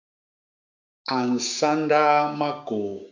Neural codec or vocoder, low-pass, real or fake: codec, 16 kHz, 6 kbps, DAC; 7.2 kHz; fake